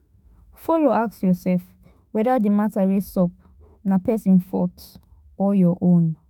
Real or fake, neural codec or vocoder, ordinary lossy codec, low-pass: fake; autoencoder, 48 kHz, 32 numbers a frame, DAC-VAE, trained on Japanese speech; none; 19.8 kHz